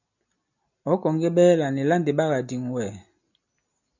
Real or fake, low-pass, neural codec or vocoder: real; 7.2 kHz; none